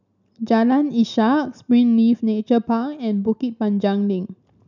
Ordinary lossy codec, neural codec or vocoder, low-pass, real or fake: none; none; 7.2 kHz; real